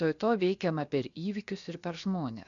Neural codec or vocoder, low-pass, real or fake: codec, 16 kHz, 0.7 kbps, FocalCodec; 7.2 kHz; fake